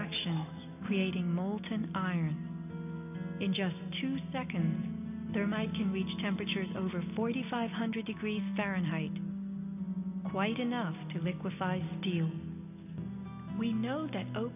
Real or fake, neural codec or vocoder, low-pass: real; none; 3.6 kHz